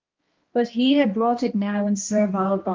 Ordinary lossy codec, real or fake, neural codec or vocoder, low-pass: Opus, 32 kbps; fake; codec, 16 kHz, 1 kbps, X-Codec, HuBERT features, trained on balanced general audio; 7.2 kHz